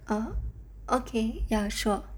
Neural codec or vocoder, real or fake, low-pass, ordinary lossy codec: none; real; none; none